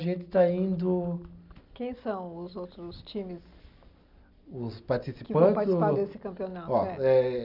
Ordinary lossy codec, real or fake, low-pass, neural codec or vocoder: none; real; 5.4 kHz; none